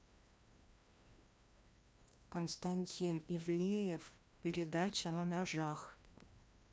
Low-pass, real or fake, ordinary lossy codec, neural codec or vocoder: none; fake; none; codec, 16 kHz, 1 kbps, FreqCodec, larger model